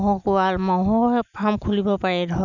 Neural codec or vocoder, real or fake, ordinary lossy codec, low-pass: none; real; none; 7.2 kHz